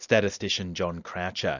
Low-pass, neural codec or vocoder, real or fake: 7.2 kHz; none; real